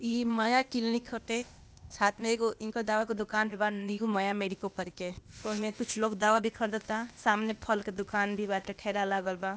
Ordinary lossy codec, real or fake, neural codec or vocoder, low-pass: none; fake; codec, 16 kHz, 0.8 kbps, ZipCodec; none